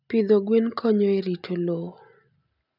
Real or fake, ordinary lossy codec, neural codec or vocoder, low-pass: real; none; none; 5.4 kHz